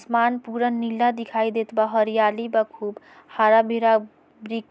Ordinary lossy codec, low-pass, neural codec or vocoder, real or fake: none; none; none; real